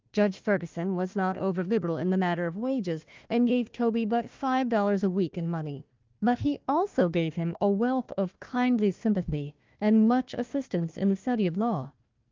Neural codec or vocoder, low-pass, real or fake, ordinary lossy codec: codec, 16 kHz, 1 kbps, FunCodec, trained on Chinese and English, 50 frames a second; 7.2 kHz; fake; Opus, 32 kbps